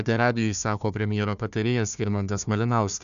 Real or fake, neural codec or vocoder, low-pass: fake; codec, 16 kHz, 1 kbps, FunCodec, trained on Chinese and English, 50 frames a second; 7.2 kHz